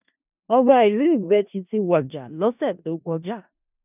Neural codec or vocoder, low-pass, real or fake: codec, 16 kHz in and 24 kHz out, 0.4 kbps, LongCat-Audio-Codec, four codebook decoder; 3.6 kHz; fake